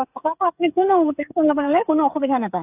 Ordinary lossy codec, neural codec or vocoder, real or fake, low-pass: AAC, 32 kbps; codec, 16 kHz, 8 kbps, FreqCodec, smaller model; fake; 3.6 kHz